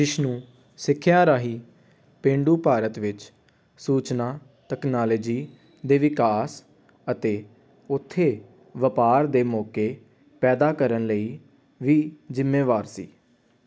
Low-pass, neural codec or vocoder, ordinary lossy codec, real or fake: none; none; none; real